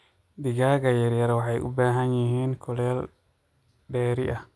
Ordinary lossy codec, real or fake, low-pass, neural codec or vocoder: none; real; none; none